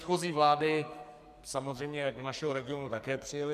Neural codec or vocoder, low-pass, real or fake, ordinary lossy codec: codec, 44.1 kHz, 2.6 kbps, SNAC; 14.4 kHz; fake; MP3, 96 kbps